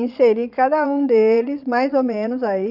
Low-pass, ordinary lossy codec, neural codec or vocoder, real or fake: 5.4 kHz; none; vocoder, 22.05 kHz, 80 mel bands, Vocos; fake